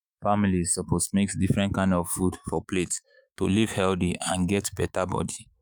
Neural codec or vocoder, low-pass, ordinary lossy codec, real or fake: autoencoder, 48 kHz, 128 numbers a frame, DAC-VAE, trained on Japanese speech; none; none; fake